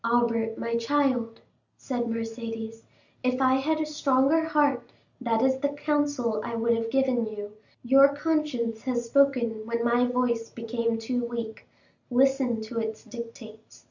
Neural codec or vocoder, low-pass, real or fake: none; 7.2 kHz; real